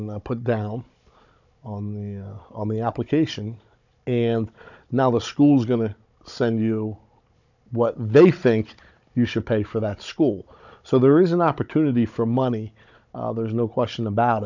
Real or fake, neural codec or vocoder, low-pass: fake; codec, 16 kHz, 16 kbps, FunCodec, trained on Chinese and English, 50 frames a second; 7.2 kHz